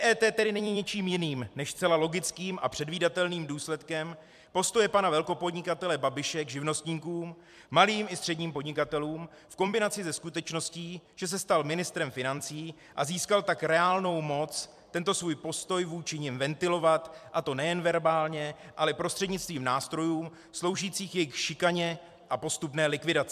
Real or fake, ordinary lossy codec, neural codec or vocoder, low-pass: fake; MP3, 96 kbps; vocoder, 44.1 kHz, 128 mel bands every 256 samples, BigVGAN v2; 14.4 kHz